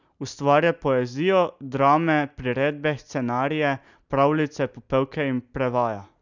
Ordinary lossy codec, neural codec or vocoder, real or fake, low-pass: none; none; real; 7.2 kHz